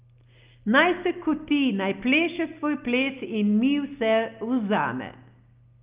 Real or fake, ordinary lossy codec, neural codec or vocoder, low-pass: real; Opus, 24 kbps; none; 3.6 kHz